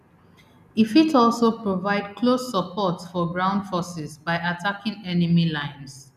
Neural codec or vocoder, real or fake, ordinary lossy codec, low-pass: none; real; none; 14.4 kHz